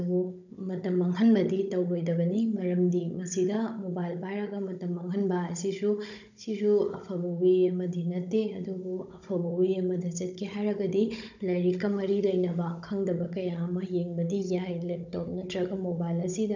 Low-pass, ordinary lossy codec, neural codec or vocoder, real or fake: 7.2 kHz; none; codec, 16 kHz, 16 kbps, FunCodec, trained on Chinese and English, 50 frames a second; fake